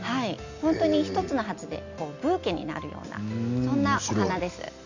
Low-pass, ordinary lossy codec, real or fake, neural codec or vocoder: 7.2 kHz; Opus, 64 kbps; real; none